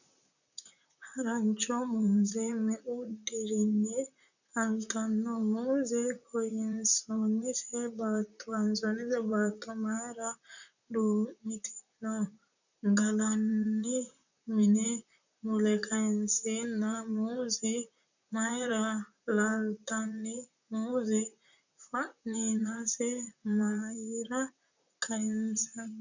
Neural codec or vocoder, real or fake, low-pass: vocoder, 44.1 kHz, 128 mel bands, Pupu-Vocoder; fake; 7.2 kHz